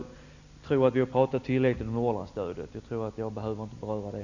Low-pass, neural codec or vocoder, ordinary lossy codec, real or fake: 7.2 kHz; none; none; real